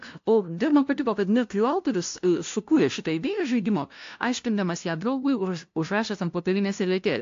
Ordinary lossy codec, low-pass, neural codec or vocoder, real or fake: AAC, 48 kbps; 7.2 kHz; codec, 16 kHz, 0.5 kbps, FunCodec, trained on LibriTTS, 25 frames a second; fake